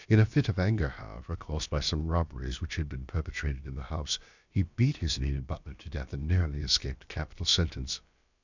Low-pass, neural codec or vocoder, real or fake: 7.2 kHz; codec, 16 kHz, about 1 kbps, DyCAST, with the encoder's durations; fake